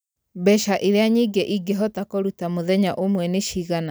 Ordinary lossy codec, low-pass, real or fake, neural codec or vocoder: none; none; real; none